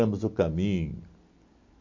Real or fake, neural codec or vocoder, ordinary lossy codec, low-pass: real; none; MP3, 48 kbps; 7.2 kHz